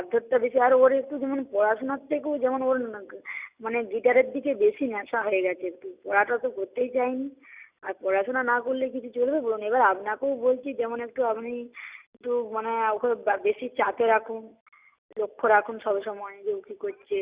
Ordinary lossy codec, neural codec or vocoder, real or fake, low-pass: Opus, 64 kbps; none; real; 3.6 kHz